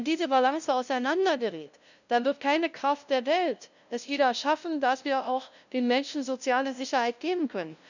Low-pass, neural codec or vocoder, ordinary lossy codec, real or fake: 7.2 kHz; codec, 16 kHz, 0.5 kbps, FunCodec, trained on LibriTTS, 25 frames a second; none; fake